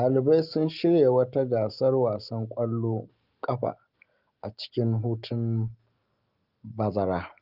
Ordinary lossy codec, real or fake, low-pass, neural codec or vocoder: Opus, 24 kbps; real; 5.4 kHz; none